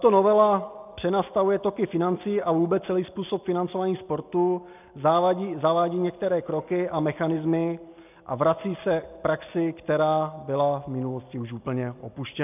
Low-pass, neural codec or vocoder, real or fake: 3.6 kHz; none; real